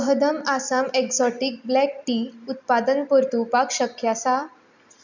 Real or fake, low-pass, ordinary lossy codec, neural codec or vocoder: real; 7.2 kHz; none; none